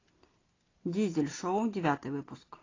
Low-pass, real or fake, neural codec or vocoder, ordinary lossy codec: 7.2 kHz; real; none; AAC, 32 kbps